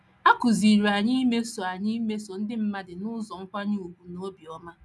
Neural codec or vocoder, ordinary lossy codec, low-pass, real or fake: none; none; none; real